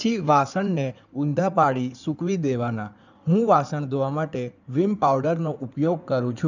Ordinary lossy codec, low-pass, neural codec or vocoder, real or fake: none; 7.2 kHz; codec, 16 kHz in and 24 kHz out, 2.2 kbps, FireRedTTS-2 codec; fake